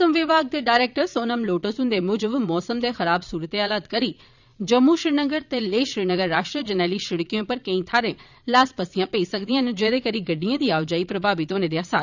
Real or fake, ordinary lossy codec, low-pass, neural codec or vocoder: fake; none; 7.2 kHz; vocoder, 22.05 kHz, 80 mel bands, Vocos